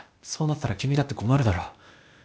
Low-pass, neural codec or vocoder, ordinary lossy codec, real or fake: none; codec, 16 kHz, 0.8 kbps, ZipCodec; none; fake